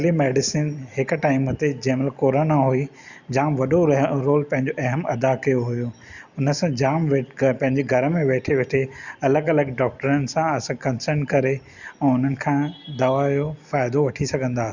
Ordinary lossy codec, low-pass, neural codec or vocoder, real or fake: Opus, 64 kbps; 7.2 kHz; none; real